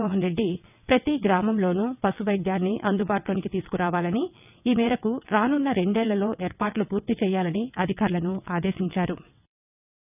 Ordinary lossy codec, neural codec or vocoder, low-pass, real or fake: none; vocoder, 22.05 kHz, 80 mel bands, WaveNeXt; 3.6 kHz; fake